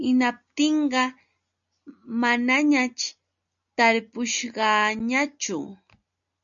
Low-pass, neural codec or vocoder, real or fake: 7.2 kHz; none; real